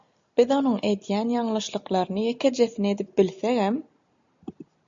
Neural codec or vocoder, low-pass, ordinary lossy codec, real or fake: none; 7.2 kHz; AAC, 64 kbps; real